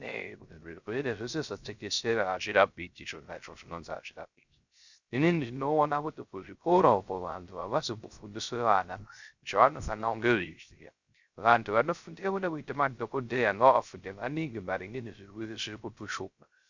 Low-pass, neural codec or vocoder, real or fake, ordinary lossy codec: 7.2 kHz; codec, 16 kHz, 0.3 kbps, FocalCodec; fake; Opus, 64 kbps